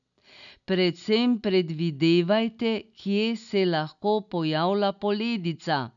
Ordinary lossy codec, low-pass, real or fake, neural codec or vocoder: none; 7.2 kHz; real; none